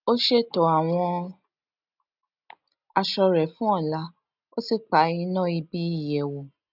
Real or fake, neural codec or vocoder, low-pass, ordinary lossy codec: real; none; 5.4 kHz; none